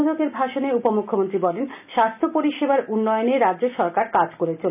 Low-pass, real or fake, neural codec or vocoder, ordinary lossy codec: 3.6 kHz; real; none; none